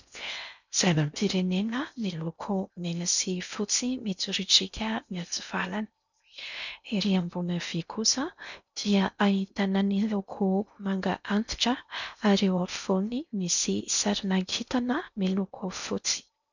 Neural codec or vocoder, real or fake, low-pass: codec, 16 kHz in and 24 kHz out, 0.6 kbps, FocalCodec, streaming, 4096 codes; fake; 7.2 kHz